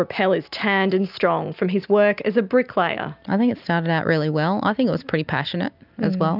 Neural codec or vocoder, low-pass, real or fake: none; 5.4 kHz; real